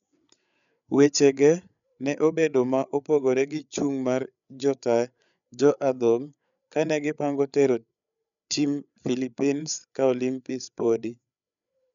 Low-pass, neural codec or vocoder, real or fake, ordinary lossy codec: 7.2 kHz; codec, 16 kHz, 8 kbps, FreqCodec, larger model; fake; none